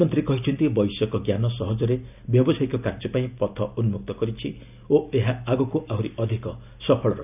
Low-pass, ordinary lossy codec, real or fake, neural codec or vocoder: 3.6 kHz; none; real; none